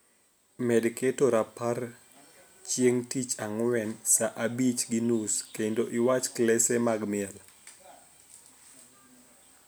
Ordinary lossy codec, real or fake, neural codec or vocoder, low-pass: none; real; none; none